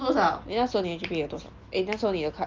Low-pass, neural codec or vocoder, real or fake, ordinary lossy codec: 7.2 kHz; none; real; Opus, 24 kbps